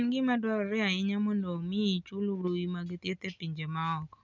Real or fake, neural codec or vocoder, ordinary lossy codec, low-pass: real; none; none; 7.2 kHz